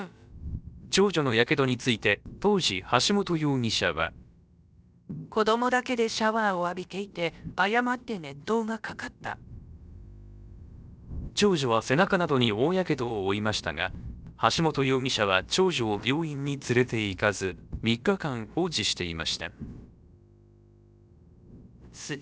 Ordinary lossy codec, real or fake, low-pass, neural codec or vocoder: none; fake; none; codec, 16 kHz, about 1 kbps, DyCAST, with the encoder's durations